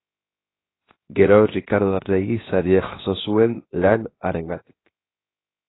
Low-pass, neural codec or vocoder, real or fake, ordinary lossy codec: 7.2 kHz; codec, 16 kHz, 0.7 kbps, FocalCodec; fake; AAC, 16 kbps